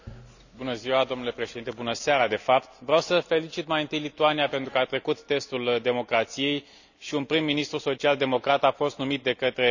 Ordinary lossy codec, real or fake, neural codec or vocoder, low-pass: none; real; none; 7.2 kHz